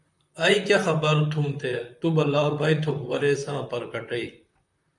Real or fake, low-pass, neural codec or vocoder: fake; 10.8 kHz; vocoder, 44.1 kHz, 128 mel bands, Pupu-Vocoder